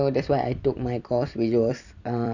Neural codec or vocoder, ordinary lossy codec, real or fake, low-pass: vocoder, 44.1 kHz, 128 mel bands every 256 samples, BigVGAN v2; none; fake; 7.2 kHz